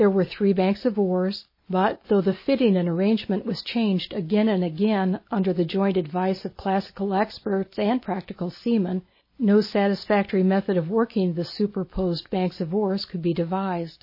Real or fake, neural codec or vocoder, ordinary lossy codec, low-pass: real; none; MP3, 24 kbps; 5.4 kHz